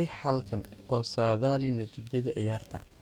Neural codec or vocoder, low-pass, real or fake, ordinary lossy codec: codec, 44.1 kHz, 2.6 kbps, DAC; 19.8 kHz; fake; none